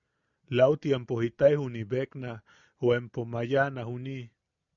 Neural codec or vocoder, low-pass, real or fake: none; 7.2 kHz; real